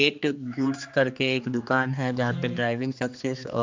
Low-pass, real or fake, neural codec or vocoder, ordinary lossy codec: 7.2 kHz; fake; codec, 16 kHz, 2 kbps, X-Codec, HuBERT features, trained on general audio; MP3, 64 kbps